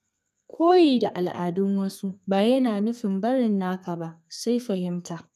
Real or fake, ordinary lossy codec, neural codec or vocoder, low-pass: fake; none; codec, 32 kHz, 1.9 kbps, SNAC; 14.4 kHz